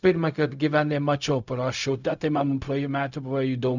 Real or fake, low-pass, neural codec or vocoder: fake; 7.2 kHz; codec, 16 kHz, 0.4 kbps, LongCat-Audio-Codec